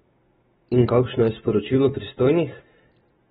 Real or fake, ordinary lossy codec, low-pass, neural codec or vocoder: real; AAC, 16 kbps; 14.4 kHz; none